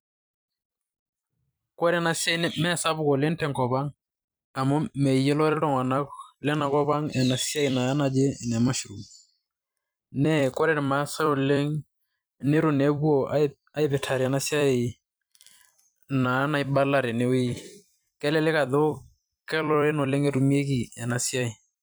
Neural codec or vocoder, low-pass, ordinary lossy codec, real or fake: vocoder, 44.1 kHz, 128 mel bands every 256 samples, BigVGAN v2; none; none; fake